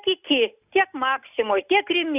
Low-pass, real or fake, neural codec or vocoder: 3.6 kHz; real; none